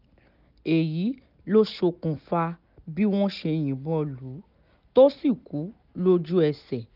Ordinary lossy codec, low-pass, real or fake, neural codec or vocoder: none; 5.4 kHz; real; none